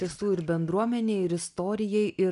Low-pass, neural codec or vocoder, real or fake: 10.8 kHz; none; real